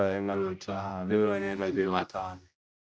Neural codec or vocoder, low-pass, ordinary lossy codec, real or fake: codec, 16 kHz, 0.5 kbps, X-Codec, HuBERT features, trained on general audio; none; none; fake